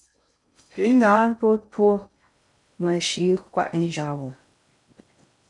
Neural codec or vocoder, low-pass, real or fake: codec, 16 kHz in and 24 kHz out, 0.6 kbps, FocalCodec, streaming, 4096 codes; 10.8 kHz; fake